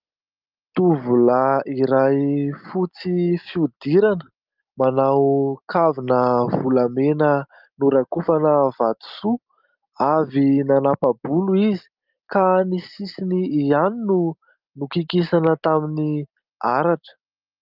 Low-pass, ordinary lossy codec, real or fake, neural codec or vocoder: 5.4 kHz; Opus, 24 kbps; real; none